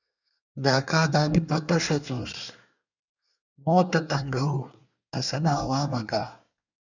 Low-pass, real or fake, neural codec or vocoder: 7.2 kHz; fake; codec, 24 kHz, 1 kbps, SNAC